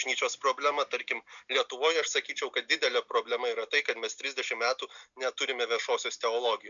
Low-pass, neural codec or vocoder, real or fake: 7.2 kHz; none; real